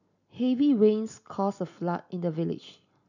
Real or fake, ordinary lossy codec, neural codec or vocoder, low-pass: real; none; none; 7.2 kHz